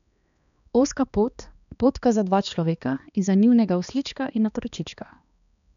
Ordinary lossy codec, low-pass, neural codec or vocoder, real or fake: none; 7.2 kHz; codec, 16 kHz, 2 kbps, X-Codec, HuBERT features, trained on balanced general audio; fake